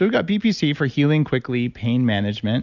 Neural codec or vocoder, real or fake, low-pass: none; real; 7.2 kHz